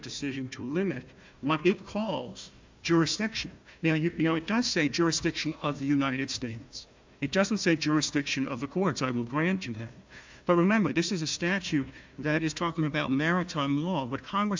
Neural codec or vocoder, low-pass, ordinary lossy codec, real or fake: codec, 16 kHz, 1 kbps, FunCodec, trained on Chinese and English, 50 frames a second; 7.2 kHz; MP3, 64 kbps; fake